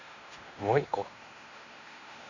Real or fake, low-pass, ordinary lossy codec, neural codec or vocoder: fake; 7.2 kHz; none; codec, 16 kHz in and 24 kHz out, 0.9 kbps, LongCat-Audio-Codec, fine tuned four codebook decoder